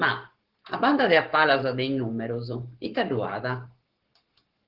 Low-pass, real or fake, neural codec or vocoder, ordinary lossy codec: 5.4 kHz; fake; codec, 44.1 kHz, 7.8 kbps, Pupu-Codec; Opus, 16 kbps